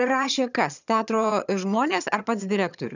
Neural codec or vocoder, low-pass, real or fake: vocoder, 22.05 kHz, 80 mel bands, HiFi-GAN; 7.2 kHz; fake